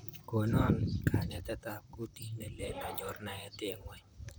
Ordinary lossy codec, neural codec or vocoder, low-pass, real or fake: none; vocoder, 44.1 kHz, 128 mel bands, Pupu-Vocoder; none; fake